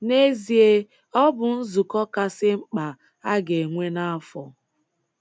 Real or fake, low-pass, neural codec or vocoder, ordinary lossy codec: real; none; none; none